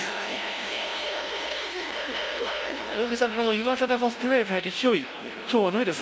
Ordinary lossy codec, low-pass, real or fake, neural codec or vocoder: none; none; fake; codec, 16 kHz, 0.5 kbps, FunCodec, trained on LibriTTS, 25 frames a second